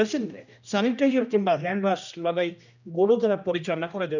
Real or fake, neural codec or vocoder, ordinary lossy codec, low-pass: fake; codec, 16 kHz, 1 kbps, X-Codec, HuBERT features, trained on general audio; none; 7.2 kHz